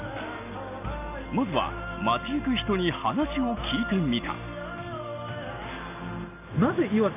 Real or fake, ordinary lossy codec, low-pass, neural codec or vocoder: real; none; 3.6 kHz; none